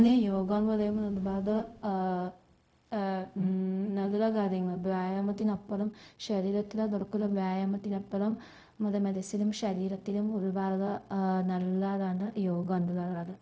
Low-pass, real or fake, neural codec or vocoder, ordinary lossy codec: none; fake; codec, 16 kHz, 0.4 kbps, LongCat-Audio-Codec; none